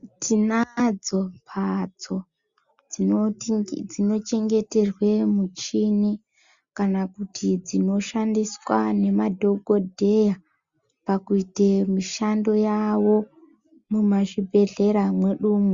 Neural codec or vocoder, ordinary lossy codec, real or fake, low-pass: none; Opus, 64 kbps; real; 7.2 kHz